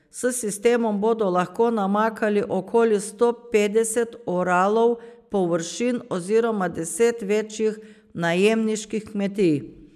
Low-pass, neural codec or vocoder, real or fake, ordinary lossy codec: 14.4 kHz; none; real; none